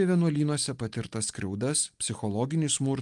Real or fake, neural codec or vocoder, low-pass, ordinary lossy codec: real; none; 10.8 kHz; Opus, 32 kbps